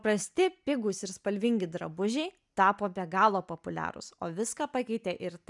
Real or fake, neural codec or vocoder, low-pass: real; none; 10.8 kHz